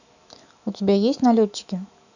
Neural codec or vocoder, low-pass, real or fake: autoencoder, 48 kHz, 128 numbers a frame, DAC-VAE, trained on Japanese speech; 7.2 kHz; fake